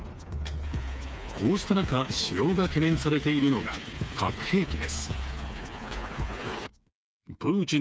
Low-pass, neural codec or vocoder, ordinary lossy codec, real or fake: none; codec, 16 kHz, 4 kbps, FreqCodec, smaller model; none; fake